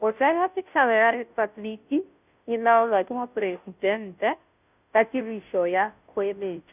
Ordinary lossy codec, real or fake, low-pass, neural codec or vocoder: none; fake; 3.6 kHz; codec, 16 kHz, 0.5 kbps, FunCodec, trained on Chinese and English, 25 frames a second